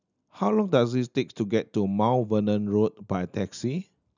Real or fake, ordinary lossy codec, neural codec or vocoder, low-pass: real; none; none; 7.2 kHz